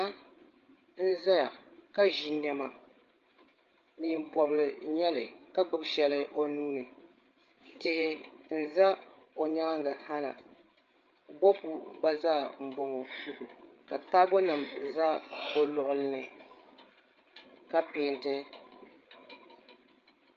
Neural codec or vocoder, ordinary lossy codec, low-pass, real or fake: codec, 16 kHz, 8 kbps, FreqCodec, larger model; Opus, 24 kbps; 7.2 kHz; fake